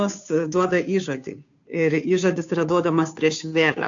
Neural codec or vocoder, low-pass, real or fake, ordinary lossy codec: codec, 16 kHz, 2 kbps, FunCodec, trained on Chinese and English, 25 frames a second; 7.2 kHz; fake; AAC, 64 kbps